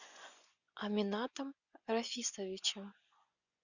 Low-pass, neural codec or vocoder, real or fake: 7.2 kHz; vocoder, 24 kHz, 100 mel bands, Vocos; fake